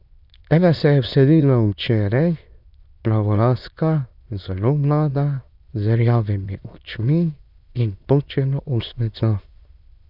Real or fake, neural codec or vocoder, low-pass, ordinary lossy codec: fake; autoencoder, 22.05 kHz, a latent of 192 numbers a frame, VITS, trained on many speakers; 5.4 kHz; none